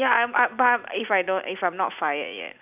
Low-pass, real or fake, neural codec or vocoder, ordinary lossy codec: 3.6 kHz; real; none; none